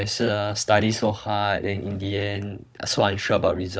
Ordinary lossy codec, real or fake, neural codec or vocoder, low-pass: none; fake; codec, 16 kHz, 16 kbps, FunCodec, trained on Chinese and English, 50 frames a second; none